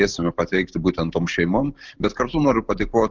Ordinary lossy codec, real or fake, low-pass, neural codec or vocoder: Opus, 24 kbps; real; 7.2 kHz; none